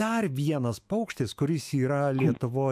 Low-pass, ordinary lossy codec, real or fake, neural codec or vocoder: 14.4 kHz; MP3, 96 kbps; fake; autoencoder, 48 kHz, 128 numbers a frame, DAC-VAE, trained on Japanese speech